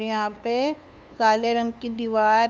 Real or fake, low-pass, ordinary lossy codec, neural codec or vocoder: fake; none; none; codec, 16 kHz, 2 kbps, FunCodec, trained on LibriTTS, 25 frames a second